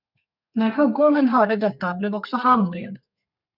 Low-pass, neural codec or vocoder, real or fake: 5.4 kHz; codec, 32 kHz, 1.9 kbps, SNAC; fake